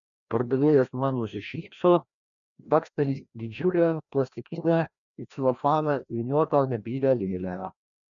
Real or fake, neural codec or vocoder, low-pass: fake; codec, 16 kHz, 1 kbps, FreqCodec, larger model; 7.2 kHz